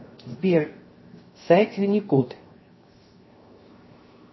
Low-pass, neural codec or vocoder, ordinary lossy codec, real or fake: 7.2 kHz; codec, 16 kHz, 0.7 kbps, FocalCodec; MP3, 24 kbps; fake